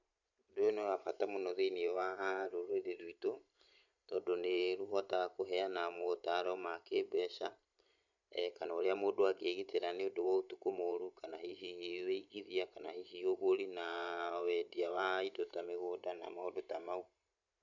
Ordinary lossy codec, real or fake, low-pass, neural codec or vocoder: none; fake; 7.2 kHz; vocoder, 44.1 kHz, 128 mel bands every 512 samples, BigVGAN v2